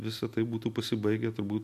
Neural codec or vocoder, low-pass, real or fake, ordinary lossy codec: none; 14.4 kHz; real; MP3, 64 kbps